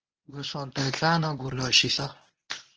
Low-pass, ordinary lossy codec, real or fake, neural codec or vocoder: 7.2 kHz; Opus, 16 kbps; fake; codec, 24 kHz, 0.9 kbps, WavTokenizer, medium speech release version 1